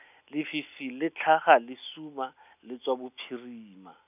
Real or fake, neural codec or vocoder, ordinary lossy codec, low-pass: real; none; none; 3.6 kHz